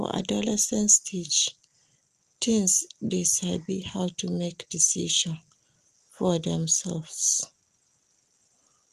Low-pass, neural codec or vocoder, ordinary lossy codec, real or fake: 14.4 kHz; none; Opus, 24 kbps; real